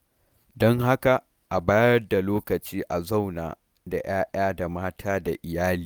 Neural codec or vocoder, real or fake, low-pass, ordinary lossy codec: none; real; none; none